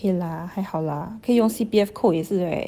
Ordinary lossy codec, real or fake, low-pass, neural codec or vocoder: Opus, 24 kbps; real; 14.4 kHz; none